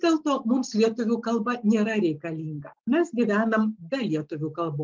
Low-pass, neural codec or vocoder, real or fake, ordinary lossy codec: 7.2 kHz; none; real; Opus, 24 kbps